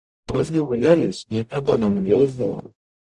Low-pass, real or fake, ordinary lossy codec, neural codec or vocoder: 10.8 kHz; fake; Opus, 64 kbps; codec, 44.1 kHz, 0.9 kbps, DAC